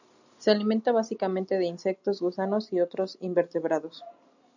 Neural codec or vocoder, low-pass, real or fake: none; 7.2 kHz; real